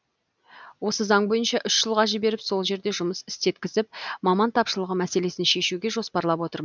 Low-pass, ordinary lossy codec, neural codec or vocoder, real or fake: 7.2 kHz; none; none; real